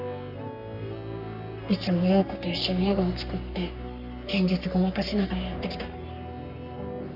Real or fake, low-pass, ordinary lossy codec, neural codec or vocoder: fake; 5.4 kHz; none; codec, 44.1 kHz, 3.4 kbps, Pupu-Codec